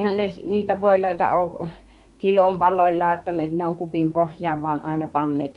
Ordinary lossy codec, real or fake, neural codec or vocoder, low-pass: none; fake; codec, 24 kHz, 1 kbps, SNAC; 10.8 kHz